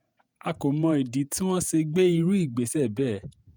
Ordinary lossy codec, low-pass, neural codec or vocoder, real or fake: none; none; vocoder, 48 kHz, 128 mel bands, Vocos; fake